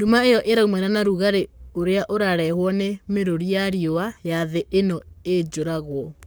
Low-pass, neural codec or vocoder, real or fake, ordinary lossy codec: none; codec, 44.1 kHz, 7.8 kbps, Pupu-Codec; fake; none